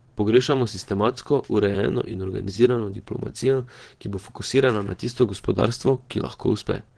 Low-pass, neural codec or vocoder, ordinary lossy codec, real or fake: 9.9 kHz; vocoder, 22.05 kHz, 80 mel bands, WaveNeXt; Opus, 16 kbps; fake